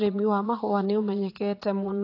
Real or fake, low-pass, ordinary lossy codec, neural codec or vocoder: fake; 5.4 kHz; AAC, 32 kbps; vocoder, 22.05 kHz, 80 mel bands, Vocos